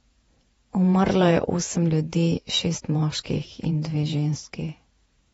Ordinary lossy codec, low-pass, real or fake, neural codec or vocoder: AAC, 24 kbps; 19.8 kHz; fake; vocoder, 48 kHz, 128 mel bands, Vocos